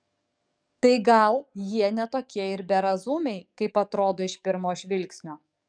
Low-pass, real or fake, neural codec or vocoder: 9.9 kHz; fake; codec, 44.1 kHz, 7.8 kbps, DAC